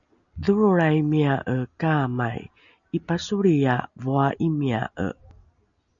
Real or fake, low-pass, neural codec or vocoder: real; 7.2 kHz; none